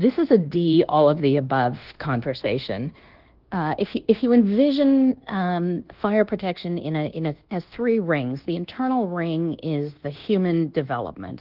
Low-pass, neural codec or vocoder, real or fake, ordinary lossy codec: 5.4 kHz; codec, 24 kHz, 1.2 kbps, DualCodec; fake; Opus, 16 kbps